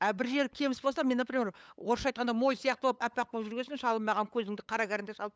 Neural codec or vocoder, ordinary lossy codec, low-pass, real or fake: codec, 16 kHz, 8 kbps, FunCodec, trained on LibriTTS, 25 frames a second; none; none; fake